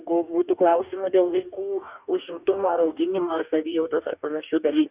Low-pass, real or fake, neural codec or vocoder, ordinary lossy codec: 3.6 kHz; fake; codec, 44.1 kHz, 2.6 kbps, DAC; Opus, 64 kbps